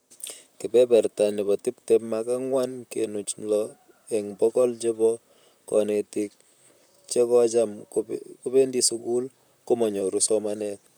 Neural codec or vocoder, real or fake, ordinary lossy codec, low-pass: vocoder, 44.1 kHz, 128 mel bands, Pupu-Vocoder; fake; none; none